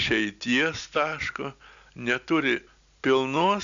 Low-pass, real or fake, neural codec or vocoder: 7.2 kHz; real; none